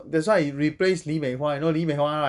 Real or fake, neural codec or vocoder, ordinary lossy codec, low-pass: real; none; none; 10.8 kHz